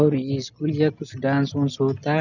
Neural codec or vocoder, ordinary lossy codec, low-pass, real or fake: none; none; 7.2 kHz; real